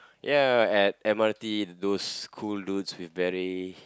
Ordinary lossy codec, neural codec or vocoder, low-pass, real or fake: none; none; none; real